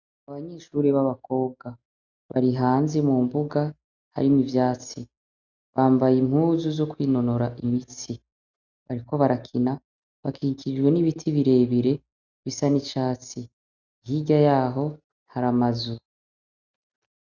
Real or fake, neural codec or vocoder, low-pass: real; none; 7.2 kHz